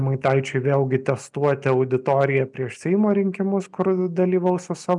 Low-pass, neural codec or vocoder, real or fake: 10.8 kHz; none; real